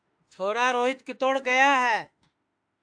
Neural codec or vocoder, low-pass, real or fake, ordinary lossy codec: autoencoder, 48 kHz, 32 numbers a frame, DAC-VAE, trained on Japanese speech; 9.9 kHz; fake; AAC, 64 kbps